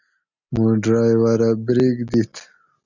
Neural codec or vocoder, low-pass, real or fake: none; 7.2 kHz; real